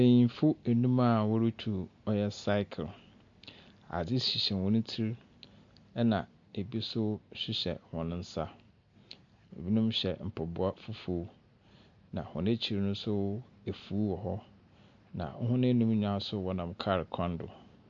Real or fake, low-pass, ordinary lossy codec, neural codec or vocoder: real; 7.2 kHz; MP3, 64 kbps; none